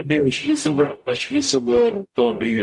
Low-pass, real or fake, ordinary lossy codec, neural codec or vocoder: 10.8 kHz; fake; MP3, 64 kbps; codec, 44.1 kHz, 0.9 kbps, DAC